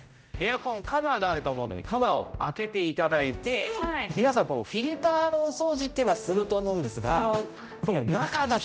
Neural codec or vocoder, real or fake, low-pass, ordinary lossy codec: codec, 16 kHz, 0.5 kbps, X-Codec, HuBERT features, trained on general audio; fake; none; none